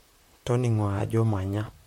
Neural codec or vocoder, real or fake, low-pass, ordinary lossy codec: vocoder, 44.1 kHz, 128 mel bands, Pupu-Vocoder; fake; 19.8 kHz; MP3, 64 kbps